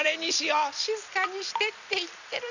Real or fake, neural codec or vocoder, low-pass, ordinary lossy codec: fake; vocoder, 44.1 kHz, 128 mel bands every 256 samples, BigVGAN v2; 7.2 kHz; none